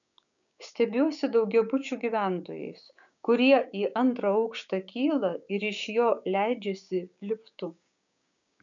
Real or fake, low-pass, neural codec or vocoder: fake; 7.2 kHz; codec, 16 kHz, 6 kbps, DAC